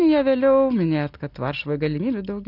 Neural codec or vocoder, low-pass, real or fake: none; 5.4 kHz; real